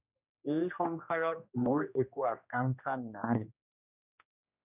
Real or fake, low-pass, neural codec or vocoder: fake; 3.6 kHz; codec, 16 kHz, 1 kbps, X-Codec, HuBERT features, trained on general audio